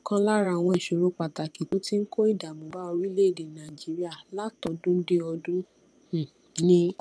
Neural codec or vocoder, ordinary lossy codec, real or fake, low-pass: vocoder, 22.05 kHz, 80 mel bands, Vocos; none; fake; none